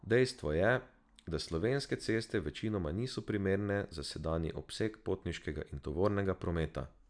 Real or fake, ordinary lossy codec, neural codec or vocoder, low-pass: real; none; none; 9.9 kHz